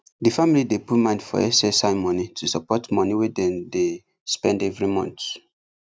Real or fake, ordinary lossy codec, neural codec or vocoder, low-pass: real; none; none; none